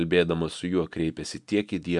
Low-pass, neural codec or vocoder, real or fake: 10.8 kHz; none; real